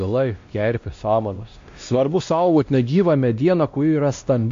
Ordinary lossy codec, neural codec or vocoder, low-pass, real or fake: MP3, 48 kbps; codec, 16 kHz, 1 kbps, X-Codec, WavLM features, trained on Multilingual LibriSpeech; 7.2 kHz; fake